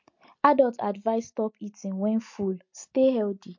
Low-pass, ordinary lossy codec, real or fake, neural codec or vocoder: 7.2 kHz; MP3, 48 kbps; real; none